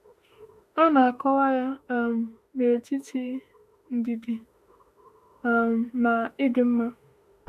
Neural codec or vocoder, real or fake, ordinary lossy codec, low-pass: autoencoder, 48 kHz, 32 numbers a frame, DAC-VAE, trained on Japanese speech; fake; none; 14.4 kHz